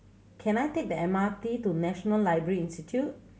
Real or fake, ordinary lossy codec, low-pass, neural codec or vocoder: real; none; none; none